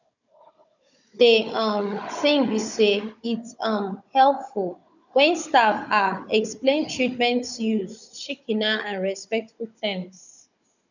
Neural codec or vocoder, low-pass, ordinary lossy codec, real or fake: codec, 16 kHz, 16 kbps, FunCodec, trained on Chinese and English, 50 frames a second; 7.2 kHz; none; fake